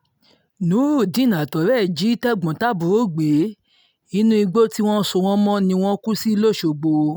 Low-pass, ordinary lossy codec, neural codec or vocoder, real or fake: none; none; none; real